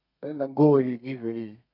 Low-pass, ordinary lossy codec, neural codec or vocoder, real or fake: 5.4 kHz; none; codec, 32 kHz, 1.9 kbps, SNAC; fake